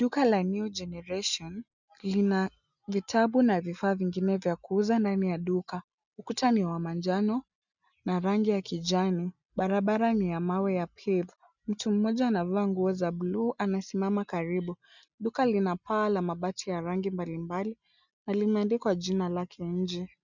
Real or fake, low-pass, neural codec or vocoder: real; 7.2 kHz; none